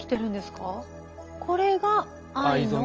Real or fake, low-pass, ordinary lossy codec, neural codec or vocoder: real; 7.2 kHz; Opus, 24 kbps; none